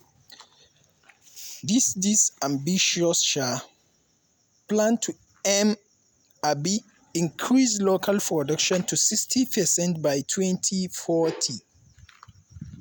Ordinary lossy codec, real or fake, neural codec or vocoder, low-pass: none; real; none; none